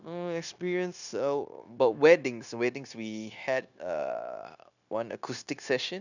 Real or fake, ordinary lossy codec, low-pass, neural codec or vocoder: fake; none; 7.2 kHz; codec, 16 kHz, 0.9 kbps, LongCat-Audio-Codec